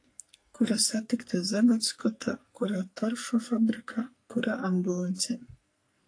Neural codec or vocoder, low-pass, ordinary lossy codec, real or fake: codec, 44.1 kHz, 2.6 kbps, SNAC; 9.9 kHz; AAC, 48 kbps; fake